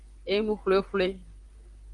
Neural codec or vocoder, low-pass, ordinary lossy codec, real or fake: vocoder, 44.1 kHz, 128 mel bands, Pupu-Vocoder; 10.8 kHz; Opus, 32 kbps; fake